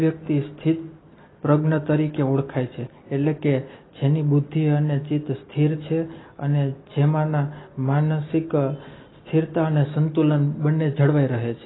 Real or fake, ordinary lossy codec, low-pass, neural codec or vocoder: real; AAC, 16 kbps; 7.2 kHz; none